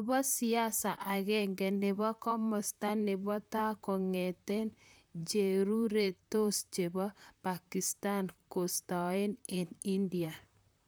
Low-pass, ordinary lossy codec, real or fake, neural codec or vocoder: none; none; fake; vocoder, 44.1 kHz, 128 mel bands, Pupu-Vocoder